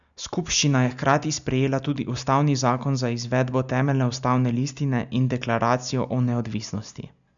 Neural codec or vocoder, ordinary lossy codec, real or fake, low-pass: none; none; real; 7.2 kHz